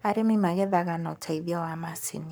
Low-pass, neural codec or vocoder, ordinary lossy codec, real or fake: none; codec, 44.1 kHz, 7.8 kbps, Pupu-Codec; none; fake